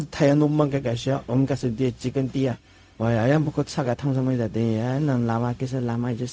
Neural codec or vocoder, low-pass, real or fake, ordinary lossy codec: codec, 16 kHz, 0.4 kbps, LongCat-Audio-Codec; none; fake; none